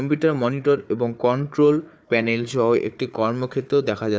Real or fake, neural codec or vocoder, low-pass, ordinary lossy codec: fake; codec, 16 kHz, 4 kbps, FunCodec, trained on Chinese and English, 50 frames a second; none; none